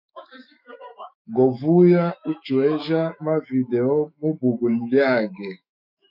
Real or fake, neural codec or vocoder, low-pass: fake; autoencoder, 48 kHz, 128 numbers a frame, DAC-VAE, trained on Japanese speech; 5.4 kHz